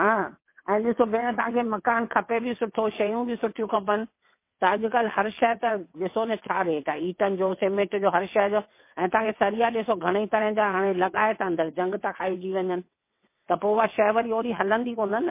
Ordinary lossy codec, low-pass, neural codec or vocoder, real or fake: MP3, 24 kbps; 3.6 kHz; vocoder, 22.05 kHz, 80 mel bands, WaveNeXt; fake